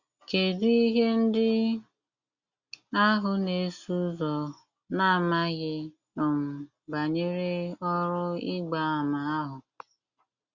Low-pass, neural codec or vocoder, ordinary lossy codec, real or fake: 7.2 kHz; none; Opus, 64 kbps; real